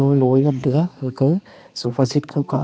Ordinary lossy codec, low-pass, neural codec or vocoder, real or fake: none; none; codec, 16 kHz, 2 kbps, X-Codec, HuBERT features, trained on balanced general audio; fake